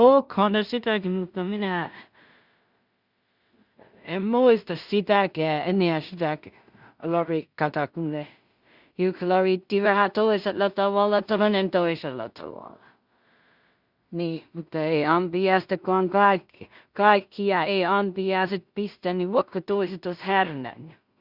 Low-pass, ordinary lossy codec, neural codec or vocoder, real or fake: 5.4 kHz; Opus, 64 kbps; codec, 16 kHz in and 24 kHz out, 0.4 kbps, LongCat-Audio-Codec, two codebook decoder; fake